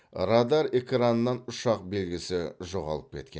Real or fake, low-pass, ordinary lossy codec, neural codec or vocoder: real; none; none; none